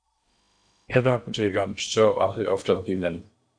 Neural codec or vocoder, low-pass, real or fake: codec, 16 kHz in and 24 kHz out, 0.8 kbps, FocalCodec, streaming, 65536 codes; 9.9 kHz; fake